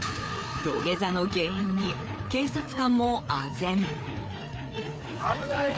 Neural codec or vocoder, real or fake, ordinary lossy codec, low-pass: codec, 16 kHz, 4 kbps, FreqCodec, larger model; fake; none; none